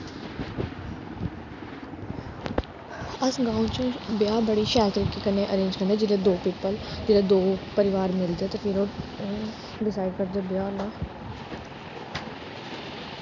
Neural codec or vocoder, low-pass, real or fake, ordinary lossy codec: none; 7.2 kHz; real; none